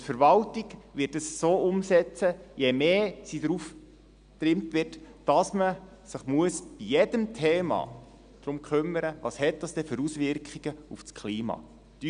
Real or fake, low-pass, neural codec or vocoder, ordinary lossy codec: real; 9.9 kHz; none; none